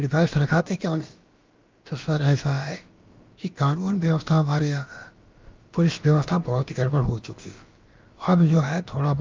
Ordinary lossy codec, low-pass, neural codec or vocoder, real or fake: Opus, 24 kbps; 7.2 kHz; codec, 16 kHz, about 1 kbps, DyCAST, with the encoder's durations; fake